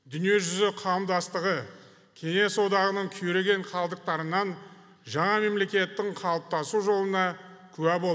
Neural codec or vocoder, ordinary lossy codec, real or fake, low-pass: none; none; real; none